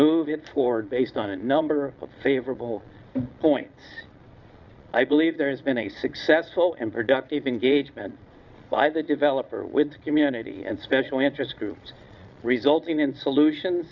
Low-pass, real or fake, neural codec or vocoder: 7.2 kHz; fake; vocoder, 22.05 kHz, 80 mel bands, Vocos